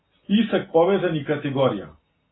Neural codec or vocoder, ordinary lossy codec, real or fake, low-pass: none; AAC, 16 kbps; real; 7.2 kHz